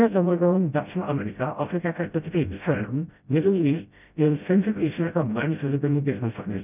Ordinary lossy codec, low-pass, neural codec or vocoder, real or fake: none; 3.6 kHz; codec, 16 kHz, 0.5 kbps, FreqCodec, smaller model; fake